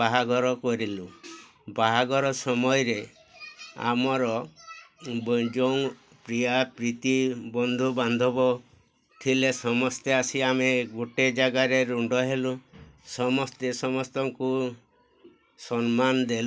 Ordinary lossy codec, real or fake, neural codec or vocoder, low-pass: none; real; none; none